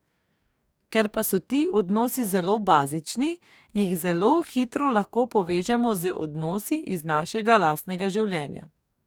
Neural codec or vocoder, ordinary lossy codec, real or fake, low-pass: codec, 44.1 kHz, 2.6 kbps, DAC; none; fake; none